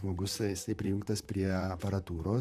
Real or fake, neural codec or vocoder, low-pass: fake; vocoder, 44.1 kHz, 128 mel bands, Pupu-Vocoder; 14.4 kHz